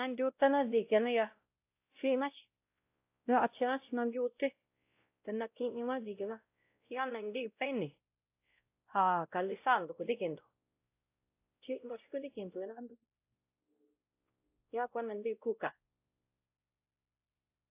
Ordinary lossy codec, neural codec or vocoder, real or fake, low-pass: AAC, 32 kbps; codec, 16 kHz, 0.5 kbps, X-Codec, WavLM features, trained on Multilingual LibriSpeech; fake; 3.6 kHz